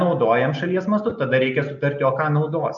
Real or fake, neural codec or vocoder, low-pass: real; none; 7.2 kHz